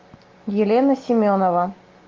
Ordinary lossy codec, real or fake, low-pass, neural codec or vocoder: Opus, 32 kbps; real; 7.2 kHz; none